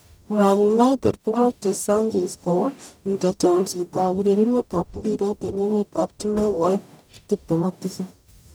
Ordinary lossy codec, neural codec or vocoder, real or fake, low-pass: none; codec, 44.1 kHz, 0.9 kbps, DAC; fake; none